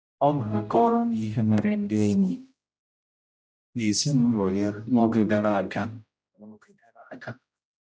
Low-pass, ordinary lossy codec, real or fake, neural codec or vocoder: none; none; fake; codec, 16 kHz, 0.5 kbps, X-Codec, HuBERT features, trained on general audio